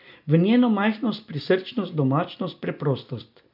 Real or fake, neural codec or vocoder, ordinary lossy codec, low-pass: real; none; none; 5.4 kHz